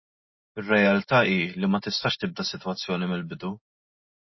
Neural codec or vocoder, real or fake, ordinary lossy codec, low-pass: none; real; MP3, 24 kbps; 7.2 kHz